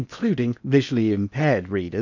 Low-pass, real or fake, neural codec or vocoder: 7.2 kHz; fake; codec, 16 kHz in and 24 kHz out, 0.8 kbps, FocalCodec, streaming, 65536 codes